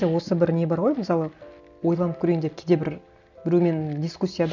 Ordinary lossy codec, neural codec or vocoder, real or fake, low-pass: none; none; real; 7.2 kHz